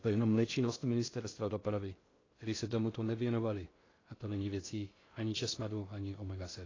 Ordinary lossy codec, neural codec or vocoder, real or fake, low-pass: AAC, 32 kbps; codec, 16 kHz in and 24 kHz out, 0.6 kbps, FocalCodec, streaming, 2048 codes; fake; 7.2 kHz